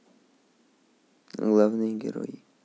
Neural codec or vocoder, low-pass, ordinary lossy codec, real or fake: none; none; none; real